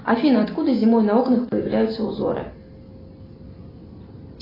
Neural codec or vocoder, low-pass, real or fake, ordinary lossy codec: none; 5.4 kHz; real; AAC, 24 kbps